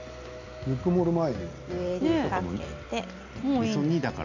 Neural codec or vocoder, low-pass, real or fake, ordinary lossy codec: none; 7.2 kHz; real; none